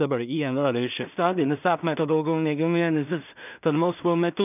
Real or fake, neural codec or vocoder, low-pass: fake; codec, 16 kHz in and 24 kHz out, 0.4 kbps, LongCat-Audio-Codec, two codebook decoder; 3.6 kHz